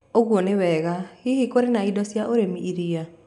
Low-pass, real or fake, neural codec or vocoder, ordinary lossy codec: 10.8 kHz; real; none; none